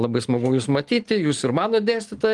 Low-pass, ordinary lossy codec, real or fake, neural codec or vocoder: 10.8 kHz; Opus, 24 kbps; real; none